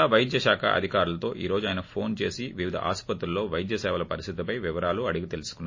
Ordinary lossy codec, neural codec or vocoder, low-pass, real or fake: MP3, 32 kbps; none; 7.2 kHz; real